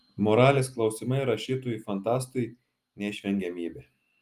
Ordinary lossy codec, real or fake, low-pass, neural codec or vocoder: Opus, 32 kbps; real; 14.4 kHz; none